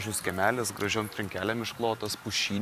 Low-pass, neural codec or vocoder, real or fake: 14.4 kHz; vocoder, 44.1 kHz, 128 mel bands every 512 samples, BigVGAN v2; fake